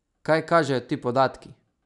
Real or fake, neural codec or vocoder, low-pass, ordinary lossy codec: real; none; 10.8 kHz; none